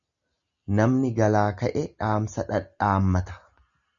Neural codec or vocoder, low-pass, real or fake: none; 7.2 kHz; real